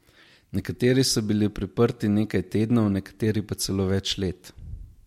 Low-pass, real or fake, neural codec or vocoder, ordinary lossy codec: 19.8 kHz; real; none; MP3, 64 kbps